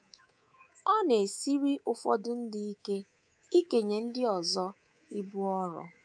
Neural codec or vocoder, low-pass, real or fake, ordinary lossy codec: codec, 24 kHz, 3.1 kbps, DualCodec; 9.9 kHz; fake; none